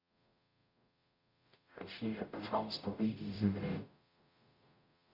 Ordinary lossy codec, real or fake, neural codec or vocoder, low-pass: MP3, 48 kbps; fake; codec, 44.1 kHz, 0.9 kbps, DAC; 5.4 kHz